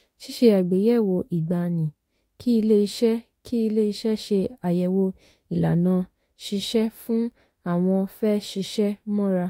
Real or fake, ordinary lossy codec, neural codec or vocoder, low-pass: fake; AAC, 48 kbps; autoencoder, 48 kHz, 32 numbers a frame, DAC-VAE, trained on Japanese speech; 19.8 kHz